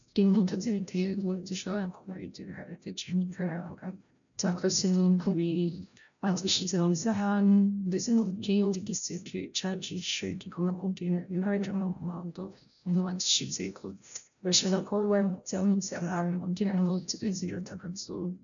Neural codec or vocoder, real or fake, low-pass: codec, 16 kHz, 0.5 kbps, FreqCodec, larger model; fake; 7.2 kHz